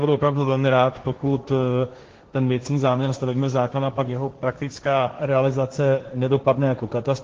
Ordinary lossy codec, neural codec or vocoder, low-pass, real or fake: Opus, 16 kbps; codec, 16 kHz, 1.1 kbps, Voila-Tokenizer; 7.2 kHz; fake